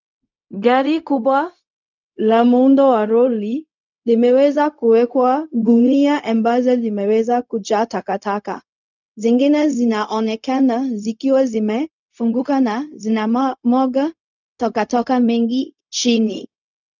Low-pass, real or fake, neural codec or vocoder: 7.2 kHz; fake; codec, 16 kHz, 0.4 kbps, LongCat-Audio-Codec